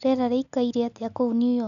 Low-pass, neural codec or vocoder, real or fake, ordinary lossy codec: 7.2 kHz; none; real; none